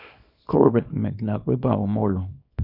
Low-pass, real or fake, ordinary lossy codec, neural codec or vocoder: 5.4 kHz; fake; none; codec, 24 kHz, 0.9 kbps, WavTokenizer, small release